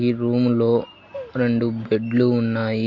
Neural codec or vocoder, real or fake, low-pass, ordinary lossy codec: none; real; 7.2 kHz; MP3, 48 kbps